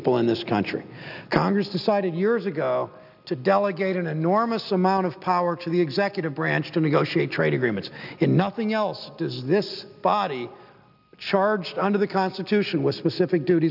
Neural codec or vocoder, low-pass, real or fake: none; 5.4 kHz; real